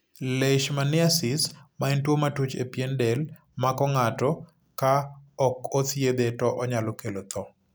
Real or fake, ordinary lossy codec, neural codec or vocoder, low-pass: real; none; none; none